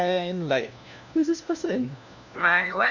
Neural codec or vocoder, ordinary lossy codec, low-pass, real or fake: codec, 16 kHz, 1 kbps, FunCodec, trained on LibriTTS, 50 frames a second; none; 7.2 kHz; fake